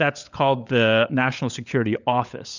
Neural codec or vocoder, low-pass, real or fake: codec, 16 kHz, 16 kbps, FunCodec, trained on LibriTTS, 50 frames a second; 7.2 kHz; fake